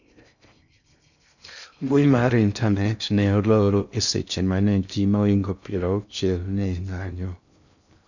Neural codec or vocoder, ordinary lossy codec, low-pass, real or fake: codec, 16 kHz in and 24 kHz out, 0.6 kbps, FocalCodec, streaming, 4096 codes; none; 7.2 kHz; fake